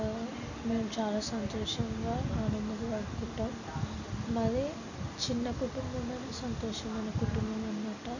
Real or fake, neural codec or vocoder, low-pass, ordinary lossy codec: real; none; 7.2 kHz; none